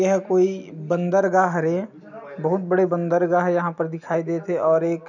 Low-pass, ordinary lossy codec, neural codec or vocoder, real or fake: 7.2 kHz; none; none; real